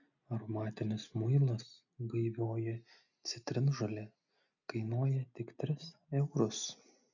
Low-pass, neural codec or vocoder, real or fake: 7.2 kHz; none; real